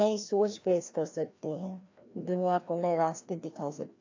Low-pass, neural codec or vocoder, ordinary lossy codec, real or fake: 7.2 kHz; codec, 16 kHz, 1 kbps, FreqCodec, larger model; AAC, 48 kbps; fake